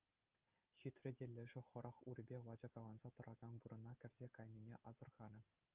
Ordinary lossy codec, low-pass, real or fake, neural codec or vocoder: Opus, 32 kbps; 3.6 kHz; real; none